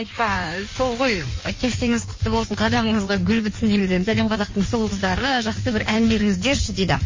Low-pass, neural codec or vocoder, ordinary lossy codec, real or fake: 7.2 kHz; codec, 16 kHz in and 24 kHz out, 1.1 kbps, FireRedTTS-2 codec; MP3, 32 kbps; fake